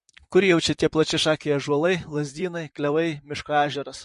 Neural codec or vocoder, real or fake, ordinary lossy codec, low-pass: vocoder, 44.1 kHz, 128 mel bands, Pupu-Vocoder; fake; MP3, 48 kbps; 14.4 kHz